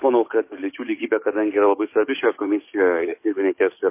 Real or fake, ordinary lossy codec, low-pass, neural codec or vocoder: fake; MP3, 24 kbps; 3.6 kHz; codec, 44.1 kHz, 7.8 kbps, DAC